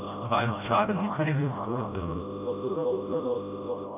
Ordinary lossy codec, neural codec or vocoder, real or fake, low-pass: none; codec, 16 kHz, 0.5 kbps, FreqCodec, smaller model; fake; 3.6 kHz